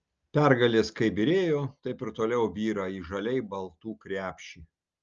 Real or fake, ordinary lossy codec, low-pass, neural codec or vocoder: real; Opus, 24 kbps; 7.2 kHz; none